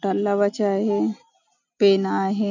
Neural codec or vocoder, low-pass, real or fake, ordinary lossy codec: none; 7.2 kHz; real; none